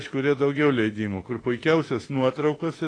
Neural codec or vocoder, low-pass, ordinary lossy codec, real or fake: autoencoder, 48 kHz, 32 numbers a frame, DAC-VAE, trained on Japanese speech; 9.9 kHz; AAC, 32 kbps; fake